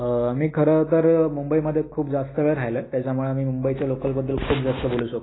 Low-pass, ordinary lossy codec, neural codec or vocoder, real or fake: 7.2 kHz; AAC, 16 kbps; none; real